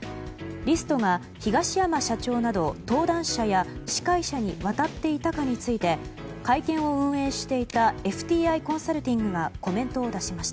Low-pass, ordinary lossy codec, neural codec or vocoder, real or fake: none; none; none; real